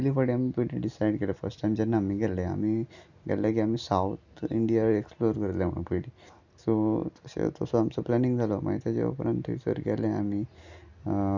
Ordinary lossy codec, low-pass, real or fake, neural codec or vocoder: none; 7.2 kHz; real; none